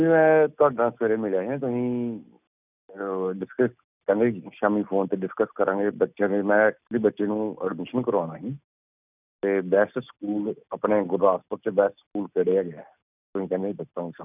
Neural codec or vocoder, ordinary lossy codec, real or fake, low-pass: none; none; real; 3.6 kHz